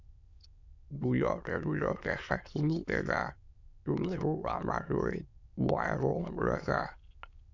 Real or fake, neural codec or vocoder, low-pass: fake; autoencoder, 22.05 kHz, a latent of 192 numbers a frame, VITS, trained on many speakers; 7.2 kHz